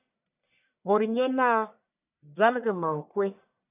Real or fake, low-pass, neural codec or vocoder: fake; 3.6 kHz; codec, 44.1 kHz, 1.7 kbps, Pupu-Codec